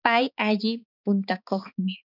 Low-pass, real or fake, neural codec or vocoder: 5.4 kHz; fake; codec, 16 kHz, 4 kbps, X-Codec, HuBERT features, trained on balanced general audio